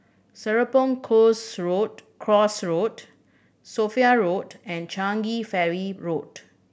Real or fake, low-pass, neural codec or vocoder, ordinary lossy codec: real; none; none; none